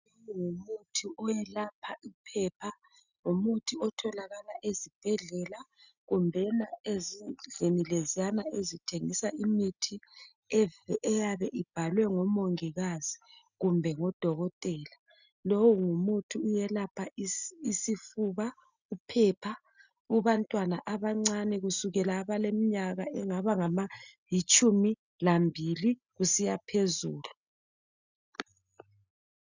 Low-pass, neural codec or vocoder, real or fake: 7.2 kHz; none; real